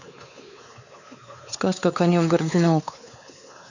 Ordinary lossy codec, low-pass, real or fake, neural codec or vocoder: none; 7.2 kHz; fake; codec, 16 kHz, 4 kbps, X-Codec, WavLM features, trained on Multilingual LibriSpeech